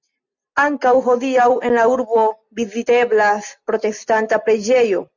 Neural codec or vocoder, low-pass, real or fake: none; 7.2 kHz; real